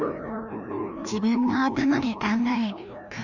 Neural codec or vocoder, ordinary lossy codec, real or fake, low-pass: codec, 16 kHz, 1 kbps, FreqCodec, larger model; none; fake; 7.2 kHz